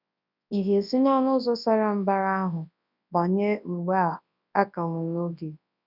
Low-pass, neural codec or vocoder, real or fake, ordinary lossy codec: 5.4 kHz; codec, 24 kHz, 0.9 kbps, WavTokenizer, large speech release; fake; none